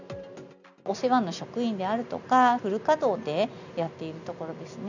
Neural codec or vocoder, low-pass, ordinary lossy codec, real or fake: none; 7.2 kHz; none; real